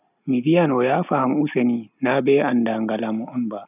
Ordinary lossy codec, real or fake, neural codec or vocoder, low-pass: none; real; none; 3.6 kHz